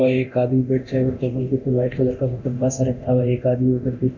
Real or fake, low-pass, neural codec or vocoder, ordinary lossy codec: fake; 7.2 kHz; codec, 24 kHz, 0.9 kbps, DualCodec; none